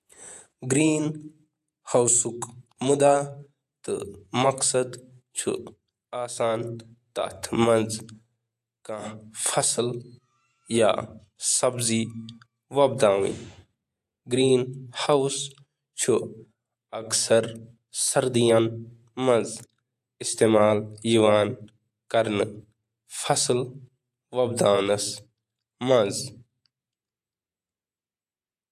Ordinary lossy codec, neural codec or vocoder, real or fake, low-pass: AAC, 96 kbps; vocoder, 48 kHz, 128 mel bands, Vocos; fake; 14.4 kHz